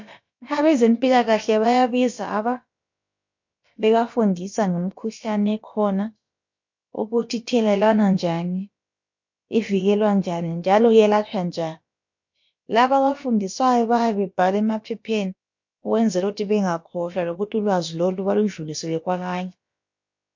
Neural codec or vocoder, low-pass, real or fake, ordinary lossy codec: codec, 16 kHz, about 1 kbps, DyCAST, with the encoder's durations; 7.2 kHz; fake; MP3, 48 kbps